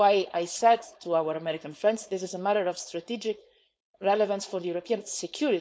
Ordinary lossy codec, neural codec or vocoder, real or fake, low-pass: none; codec, 16 kHz, 4.8 kbps, FACodec; fake; none